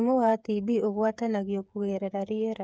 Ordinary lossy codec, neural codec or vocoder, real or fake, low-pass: none; codec, 16 kHz, 8 kbps, FreqCodec, smaller model; fake; none